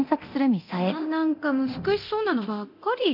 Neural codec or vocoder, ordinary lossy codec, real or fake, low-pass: codec, 24 kHz, 0.9 kbps, DualCodec; none; fake; 5.4 kHz